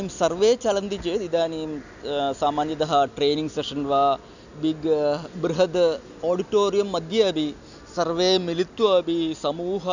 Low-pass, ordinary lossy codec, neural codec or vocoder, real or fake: 7.2 kHz; none; none; real